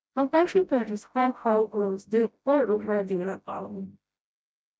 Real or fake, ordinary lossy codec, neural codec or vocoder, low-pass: fake; none; codec, 16 kHz, 0.5 kbps, FreqCodec, smaller model; none